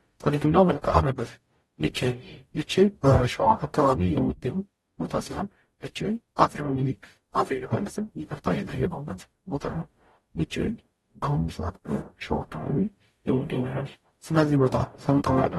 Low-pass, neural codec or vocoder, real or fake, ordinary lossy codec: 19.8 kHz; codec, 44.1 kHz, 0.9 kbps, DAC; fake; AAC, 32 kbps